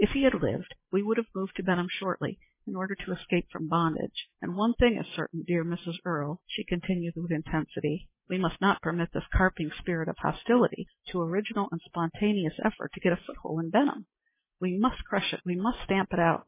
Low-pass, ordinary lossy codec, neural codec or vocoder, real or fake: 3.6 kHz; MP3, 16 kbps; none; real